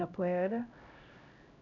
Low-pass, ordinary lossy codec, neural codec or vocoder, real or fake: 7.2 kHz; none; codec, 16 kHz, 0.5 kbps, X-Codec, HuBERT features, trained on LibriSpeech; fake